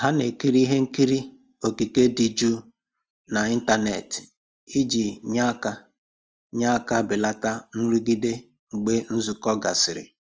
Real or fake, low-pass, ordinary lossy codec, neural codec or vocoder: real; 7.2 kHz; Opus, 24 kbps; none